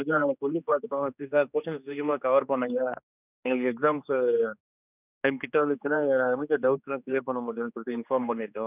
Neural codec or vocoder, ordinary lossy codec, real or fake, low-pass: codec, 16 kHz, 2 kbps, X-Codec, HuBERT features, trained on general audio; none; fake; 3.6 kHz